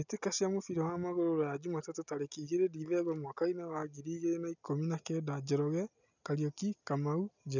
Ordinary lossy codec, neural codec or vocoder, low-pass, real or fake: none; none; 7.2 kHz; real